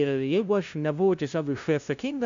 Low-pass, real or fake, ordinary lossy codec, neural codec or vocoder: 7.2 kHz; fake; MP3, 64 kbps; codec, 16 kHz, 0.5 kbps, FunCodec, trained on LibriTTS, 25 frames a second